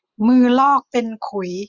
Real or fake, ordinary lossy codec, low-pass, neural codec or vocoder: real; none; 7.2 kHz; none